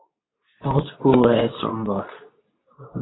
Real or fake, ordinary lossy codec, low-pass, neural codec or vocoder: fake; AAC, 16 kbps; 7.2 kHz; codec, 24 kHz, 0.9 kbps, WavTokenizer, medium speech release version 2